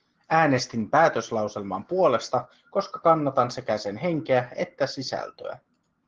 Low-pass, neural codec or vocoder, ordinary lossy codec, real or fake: 7.2 kHz; none; Opus, 16 kbps; real